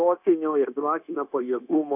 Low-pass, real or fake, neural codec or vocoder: 3.6 kHz; fake; codec, 16 kHz, 0.9 kbps, LongCat-Audio-Codec